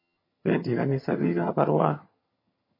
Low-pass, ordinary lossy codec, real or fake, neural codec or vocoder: 5.4 kHz; MP3, 24 kbps; fake; vocoder, 22.05 kHz, 80 mel bands, HiFi-GAN